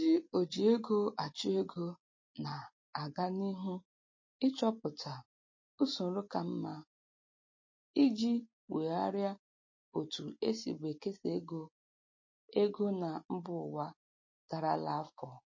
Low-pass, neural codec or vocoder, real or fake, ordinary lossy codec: 7.2 kHz; none; real; MP3, 32 kbps